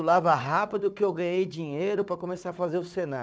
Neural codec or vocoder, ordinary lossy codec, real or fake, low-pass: codec, 16 kHz, 4 kbps, FunCodec, trained on Chinese and English, 50 frames a second; none; fake; none